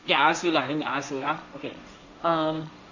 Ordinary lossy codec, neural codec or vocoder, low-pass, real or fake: none; codec, 16 kHz, 1.1 kbps, Voila-Tokenizer; 7.2 kHz; fake